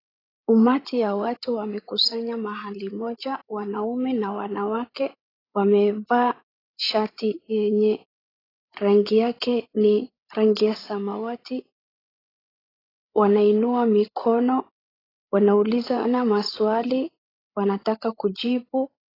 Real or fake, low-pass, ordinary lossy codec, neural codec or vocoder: fake; 5.4 kHz; AAC, 24 kbps; codec, 16 kHz, 16 kbps, FreqCodec, larger model